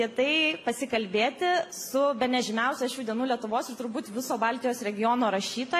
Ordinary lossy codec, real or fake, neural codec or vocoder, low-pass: AAC, 64 kbps; real; none; 14.4 kHz